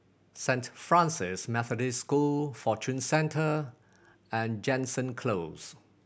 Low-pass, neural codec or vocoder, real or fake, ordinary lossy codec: none; none; real; none